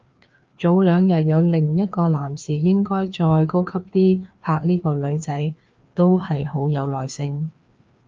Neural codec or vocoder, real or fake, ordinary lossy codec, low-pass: codec, 16 kHz, 2 kbps, FreqCodec, larger model; fake; Opus, 24 kbps; 7.2 kHz